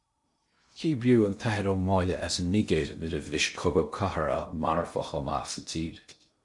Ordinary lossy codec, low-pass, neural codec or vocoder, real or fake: MP3, 64 kbps; 10.8 kHz; codec, 16 kHz in and 24 kHz out, 0.6 kbps, FocalCodec, streaming, 2048 codes; fake